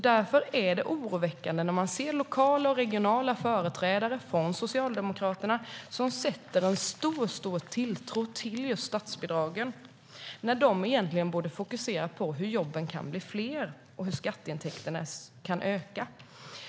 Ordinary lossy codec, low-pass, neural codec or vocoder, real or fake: none; none; none; real